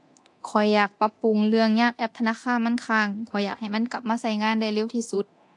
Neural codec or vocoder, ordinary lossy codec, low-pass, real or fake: codec, 24 kHz, 0.9 kbps, DualCodec; AAC, 64 kbps; 10.8 kHz; fake